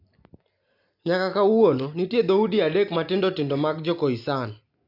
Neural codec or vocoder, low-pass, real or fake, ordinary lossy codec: none; 5.4 kHz; real; AAC, 48 kbps